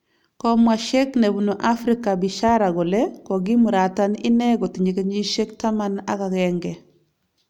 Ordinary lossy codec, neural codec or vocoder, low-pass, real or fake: none; none; 19.8 kHz; real